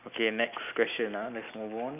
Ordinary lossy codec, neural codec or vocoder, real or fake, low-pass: none; none; real; 3.6 kHz